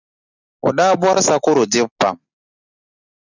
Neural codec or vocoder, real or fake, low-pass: none; real; 7.2 kHz